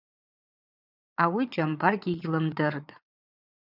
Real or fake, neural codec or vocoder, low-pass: fake; vocoder, 22.05 kHz, 80 mel bands, Vocos; 5.4 kHz